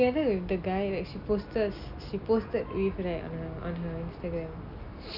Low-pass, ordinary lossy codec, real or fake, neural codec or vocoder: 5.4 kHz; none; real; none